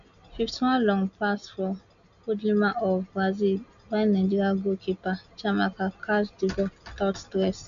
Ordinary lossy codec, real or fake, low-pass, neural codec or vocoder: none; real; 7.2 kHz; none